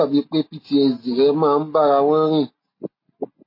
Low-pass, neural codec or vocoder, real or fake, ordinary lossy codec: 5.4 kHz; none; real; MP3, 24 kbps